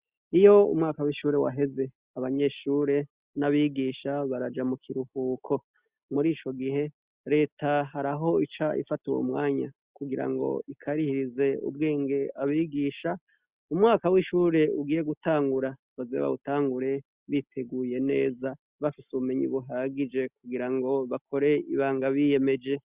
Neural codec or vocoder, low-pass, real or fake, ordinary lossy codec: none; 3.6 kHz; real; Opus, 64 kbps